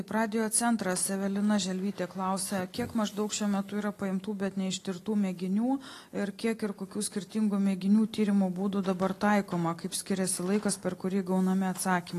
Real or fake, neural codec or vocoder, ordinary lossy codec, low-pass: real; none; AAC, 48 kbps; 14.4 kHz